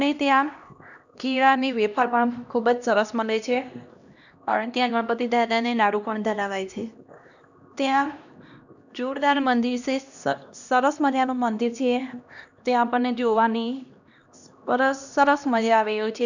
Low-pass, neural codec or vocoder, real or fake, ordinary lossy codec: 7.2 kHz; codec, 16 kHz, 1 kbps, X-Codec, HuBERT features, trained on LibriSpeech; fake; none